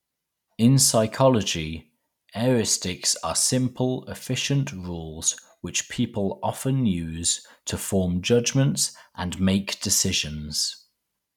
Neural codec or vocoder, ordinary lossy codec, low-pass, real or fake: none; none; 19.8 kHz; real